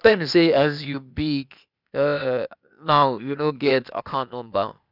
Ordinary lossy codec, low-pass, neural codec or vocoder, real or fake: none; 5.4 kHz; codec, 16 kHz, 0.8 kbps, ZipCodec; fake